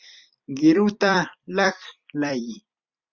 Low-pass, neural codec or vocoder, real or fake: 7.2 kHz; vocoder, 44.1 kHz, 128 mel bands every 256 samples, BigVGAN v2; fake